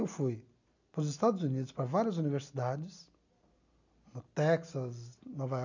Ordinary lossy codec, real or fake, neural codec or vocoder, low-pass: none; real; none; 7.2 kHz